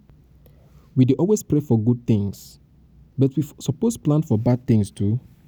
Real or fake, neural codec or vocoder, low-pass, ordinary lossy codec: real; none; none; none